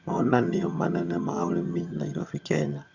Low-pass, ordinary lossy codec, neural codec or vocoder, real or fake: 7.2 kHz; Opus, 64 kbps; vocoder, 22.05 kHz, 80 mel bands, HiFi-GAN; fake